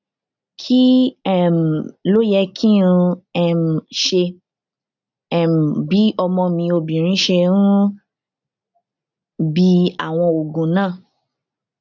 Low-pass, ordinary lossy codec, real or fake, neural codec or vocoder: 7.2 kHz; none; real; none